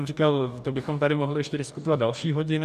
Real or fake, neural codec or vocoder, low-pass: fake; codec, 44.1 kHz, 2.6 kbps, DAC; 14.4 kHz